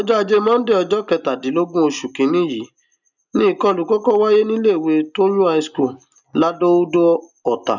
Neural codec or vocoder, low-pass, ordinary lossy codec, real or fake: none; 7.2 kHz; none; real